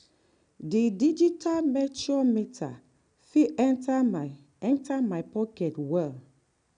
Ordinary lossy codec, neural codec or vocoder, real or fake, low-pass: none; none; real; 9.9 kHz